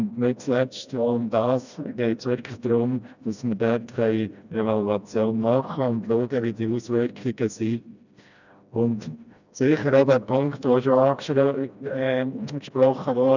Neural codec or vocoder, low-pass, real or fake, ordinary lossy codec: codec, 16 kHz, 1 kbps, FreqCodec, smaller model; 7.2 kHz; fake; none